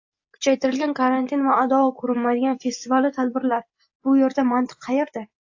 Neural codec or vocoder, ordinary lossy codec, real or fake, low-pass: none; AAC, 48 kbps; real; 7.2 kHz